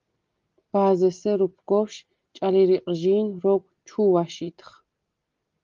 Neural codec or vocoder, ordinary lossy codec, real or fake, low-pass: none; Opus, 32 kbps; real; 7.2 kHz